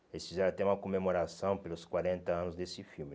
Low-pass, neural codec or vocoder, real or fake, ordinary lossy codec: none; none; real; none